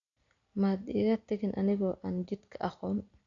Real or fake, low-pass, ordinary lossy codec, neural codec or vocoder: real; 7.2 kHz; none; none